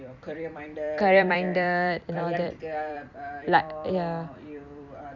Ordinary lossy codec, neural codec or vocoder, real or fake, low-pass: none; vocoder, 44.1 kHz, 128 mel bands every 256 samples, BigVGAN v2; fake; 7.2 kHz